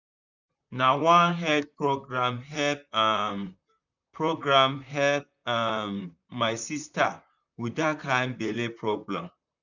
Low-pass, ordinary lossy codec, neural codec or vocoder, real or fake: 7.2 kHz; none; vocoder, 44.1 kHz, 128 mel bands, Pupu-Vocoder; fake